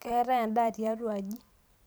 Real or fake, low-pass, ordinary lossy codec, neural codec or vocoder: fake; none; none; vocoder, 44.1 kHz, 128 mel bands every 512 samples, BigVGAN v2